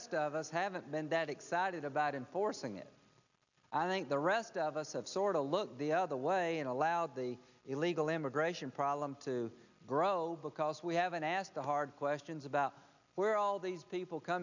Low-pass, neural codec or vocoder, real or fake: 7.2 kHz; none; real